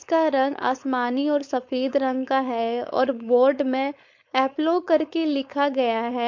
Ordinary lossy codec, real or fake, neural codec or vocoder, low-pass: MP3, 48 kbps; fake; codec, 16 kHz, 4.8 kbps, FACodec; 7.2 kHz